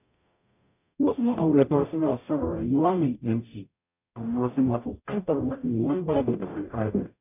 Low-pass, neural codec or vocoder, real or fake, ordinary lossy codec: 3.6 kHz; codec, 44.1 kHz, 0.9 kbps, DAC; fake; none